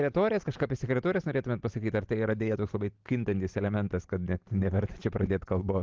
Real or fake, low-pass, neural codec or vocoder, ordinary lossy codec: real; 7.2 kHz; none; Opus, 16 kbps